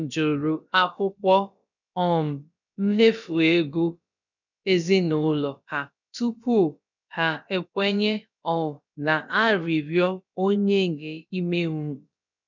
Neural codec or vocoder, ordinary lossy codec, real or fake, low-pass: codec, 16 kHz, about 1 kbps, DyCAST, with the encoder's durations; none; fake; 7.2 kHz